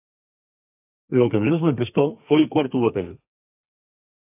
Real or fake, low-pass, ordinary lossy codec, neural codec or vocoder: fake; 3.6 kHz; AAC, 24 kbps; codec, 32 kHz, 1.9 kbps, SNAC